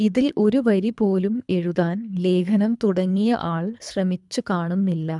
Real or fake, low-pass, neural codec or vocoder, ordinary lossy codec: fake; 10.8 kHz; codec, 24 kHz, 3 kbps, HILCodec; none